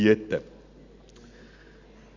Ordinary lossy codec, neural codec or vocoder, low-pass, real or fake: Opus, 64 kbps; none; 7.2 kHz; real